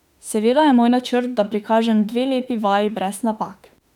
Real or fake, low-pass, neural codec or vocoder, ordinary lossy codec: fake; 19.8 kHz; autoencoder, 48 kHz, 32 numbers a frame, DAC-VAE, trained on Japanese speech; none